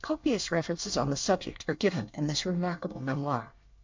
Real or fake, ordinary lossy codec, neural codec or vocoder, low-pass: fake; MP3, 64 kbps; codec, 24 kHz, 1 kbps, SNAC; 7.2 kHz